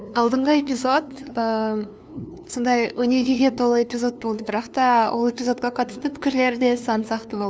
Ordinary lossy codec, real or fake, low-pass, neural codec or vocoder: none; fake; none; codec, 16 kHz, 2 kbps, FunCodec, trained on LibriTTS, 25 frames a second